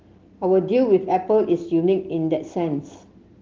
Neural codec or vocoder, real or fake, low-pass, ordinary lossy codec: none; real; 7.2 kHz; Opus, 16 kbps